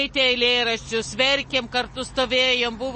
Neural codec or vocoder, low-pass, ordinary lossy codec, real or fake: none; 10.8 kHz; MP3, 32 kbps; real